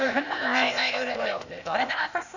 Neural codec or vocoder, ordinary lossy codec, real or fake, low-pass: codec, 16 kHz, 0.8 kbps, ZipCodec; none; fake; 7.2 kHz